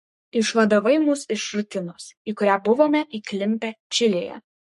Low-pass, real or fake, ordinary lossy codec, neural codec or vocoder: 14.4 kHz; fake; MP3, 48 kbps; codec, 44.1 kHz, 3.4 kbps, Pupu-Codec